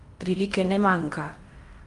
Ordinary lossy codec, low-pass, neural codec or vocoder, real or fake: Opus, 32 kbps; 10.8 kHz; codec, 16 kHz in and 24 kHz out, 0.8 kbps, FocalCodec, streaming, 65536 codes; fake